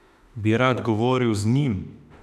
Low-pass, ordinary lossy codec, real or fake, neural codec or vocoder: 14.4 kHz; none; fake; autoencoder, 48 kHz, 32 numbers a frame, DAC-VAE, trained on Japanese speech